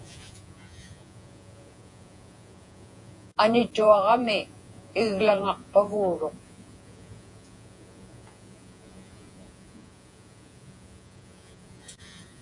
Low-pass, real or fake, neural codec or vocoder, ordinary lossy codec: 10.8 kHz; fake; vocoder, 48 kHz, 128 mel bands, Vocos; AAC, 64 kbps